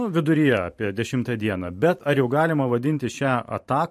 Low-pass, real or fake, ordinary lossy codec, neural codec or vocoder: 14.4 kHz; fake; MP3, 64 kbps; vocoder, 44.1 kHz, 128 mel bands every 512 samples, BigVGAN v2